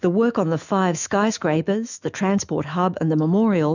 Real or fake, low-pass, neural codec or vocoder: fake; 7.2 kHz; autoencoder, 48 kHz, 128 numbers a frame, DAC-VAE, trained on Japanese speech